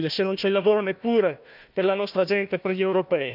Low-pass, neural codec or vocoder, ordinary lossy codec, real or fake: 5.4 kHz; codec, 16 kHz, 1 kbps, FunCodec, trained on Chinese and English, 50 frames a second; none; fake